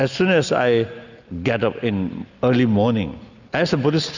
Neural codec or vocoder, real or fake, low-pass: none; real; 7.2 kHz